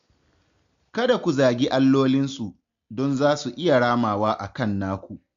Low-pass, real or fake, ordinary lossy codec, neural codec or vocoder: 7.2 kHz; real; AAC, 64 kbps; none